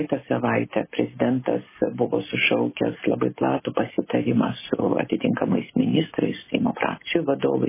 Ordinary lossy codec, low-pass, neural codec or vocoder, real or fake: MP3, 16 kbps; 3.6 kHz; none; real